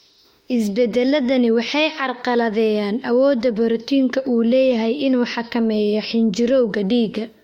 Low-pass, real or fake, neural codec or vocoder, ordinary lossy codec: 19.8 kHz; fake; autoencoder, 48 kHz, 32 numbers a frame, DAC-VAE, trained on Japanese speech; MP3, 64 kbps